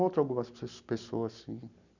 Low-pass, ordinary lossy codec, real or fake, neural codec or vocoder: 7.2 kHz; none; real; none